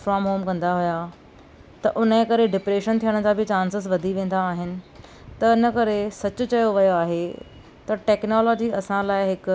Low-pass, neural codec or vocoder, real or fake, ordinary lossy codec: none; none; real; none